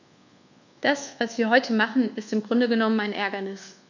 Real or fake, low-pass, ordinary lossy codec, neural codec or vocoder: fake; 7.2 kHz; none; codec, 24 kHz, 1.2 kbps, DualCodec